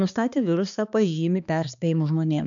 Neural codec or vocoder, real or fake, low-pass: codec, 16 kHz, 2 kbps, X-Codec, HuBERT features, trained on balanced general audio; fake; 7.2 kHz